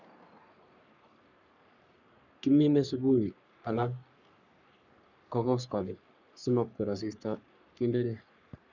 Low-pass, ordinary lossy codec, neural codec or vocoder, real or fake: 7.2 kHz; none; codec, 44.1 kHz, 3.4 kbps, Pupu-Codec; fake